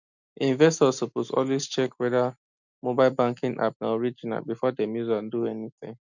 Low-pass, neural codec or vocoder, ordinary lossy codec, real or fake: 7.2 kHz; none; none; real